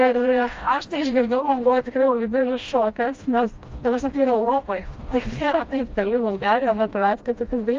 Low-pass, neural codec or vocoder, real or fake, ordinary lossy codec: 7.2 kHz; codec, 16 kHz, 1 kbps, FreqCodec, smaller model; fake; Opus, 32 kbps